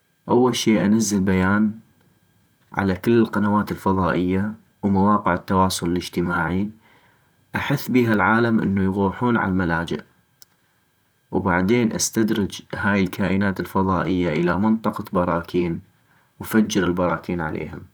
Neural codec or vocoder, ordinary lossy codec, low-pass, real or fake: vocoder, 44.1 kHz, 128 mel bands, Pupu-Vocoder; none; none; fake